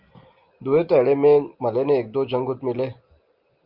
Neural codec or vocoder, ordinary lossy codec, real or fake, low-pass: none; Opus, 24 kbps; real; 5.4 kHz